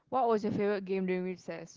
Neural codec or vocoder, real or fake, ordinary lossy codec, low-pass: none; real; Opus, 16 kbps; 7.2 kHz